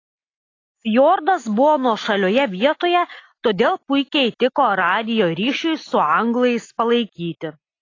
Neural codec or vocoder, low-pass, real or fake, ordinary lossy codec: none; 7.2 kHz; real; AAC, 32 kbps